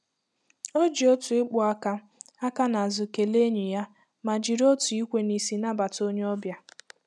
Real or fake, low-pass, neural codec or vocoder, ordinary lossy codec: real; none; none; none